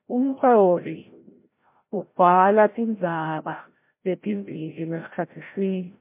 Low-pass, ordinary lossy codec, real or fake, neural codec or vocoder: 3.6 kHz; MP3, 24 kbps; fake; codec, 16 kHz, 0.5 kbps, FreqCodec, larger model